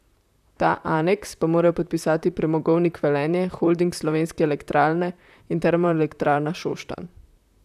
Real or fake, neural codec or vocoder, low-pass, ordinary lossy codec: fake; vocoder, 44.1 kHz, 128 mel bands, Pupu-Vocoder; 14.4 kHz; none